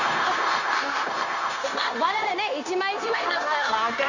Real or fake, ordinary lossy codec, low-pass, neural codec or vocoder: fake; none; 7.2 kHz; codec, 16 kHz, 0.9 kbps, LongCat-Audio-Codec